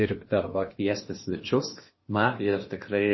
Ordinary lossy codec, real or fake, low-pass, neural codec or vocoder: MP3, 24 kbps; fake; 7.2 kHz; codec, 16 kHz in and 24 kHz out, 0.8 kbps, FocalCodec, streaming, 65536 codes